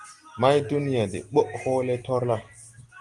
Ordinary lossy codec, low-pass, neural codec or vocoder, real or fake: Opus, 24 kbps; 10.8 kHz; none; real